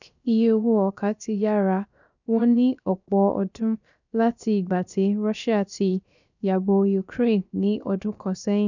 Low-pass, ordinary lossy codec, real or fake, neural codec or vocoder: 7.2 kHz; none; fake; codec, 16 kHz, 0.7 kbps, FocalCodec